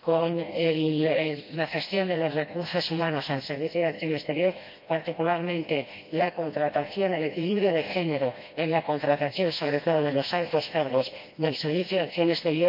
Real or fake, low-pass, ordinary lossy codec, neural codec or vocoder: fake; 5.4 kHz; MP3, 32 kbps; codec, 16 kHz, 1 kbps, FreqCodec, smaller model